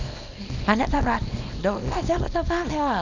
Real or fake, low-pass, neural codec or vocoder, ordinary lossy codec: fake; 7.2 kHz; codec, 24 kHz, 0.9 kbps, WavTokenizer, small release; none